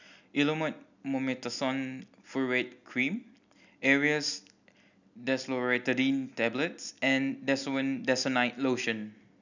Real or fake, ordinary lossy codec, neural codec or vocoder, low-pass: real; none; none; 7.2 kHz